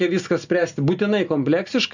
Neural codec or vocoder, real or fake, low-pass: none; real; 7.2 kHz